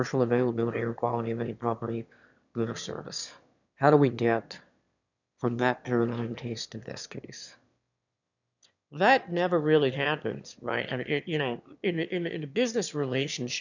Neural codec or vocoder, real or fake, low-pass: autoencoder, 22.05 kHz, a latent of 192 numbers a frame, VITS, trained on one speaker; fake; 7.2 kHz